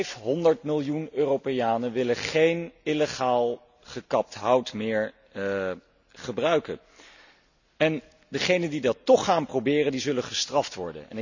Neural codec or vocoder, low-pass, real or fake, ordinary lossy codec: none; 7.2 kHz; real; none